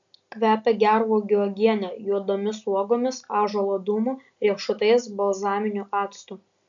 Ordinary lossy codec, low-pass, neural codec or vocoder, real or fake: AAC, 64 kbps; 7.2 kHz; none; real